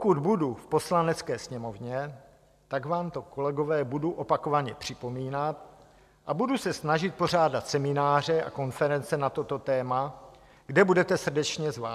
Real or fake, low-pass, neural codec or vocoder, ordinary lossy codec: real; 14.4 kHz; none; AAC, 96 kbps